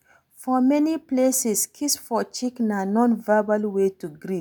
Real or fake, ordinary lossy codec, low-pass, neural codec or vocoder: real; none; none; none